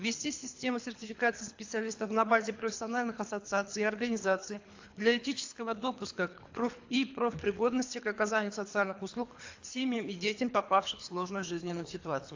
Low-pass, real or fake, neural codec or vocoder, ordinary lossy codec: 7.2 kHz; fake; codec, 24 kHz, 3 kbps, HILCodec; AAC, 48 kbps